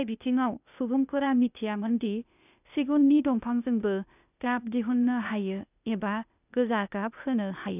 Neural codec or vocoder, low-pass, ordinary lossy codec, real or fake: codec, 16 kHz, 0.8 kbps, ZipCodec; 3.6 kHz; none; fake